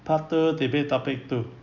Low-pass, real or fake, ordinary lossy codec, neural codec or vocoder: 7.2 kHz; real; none; none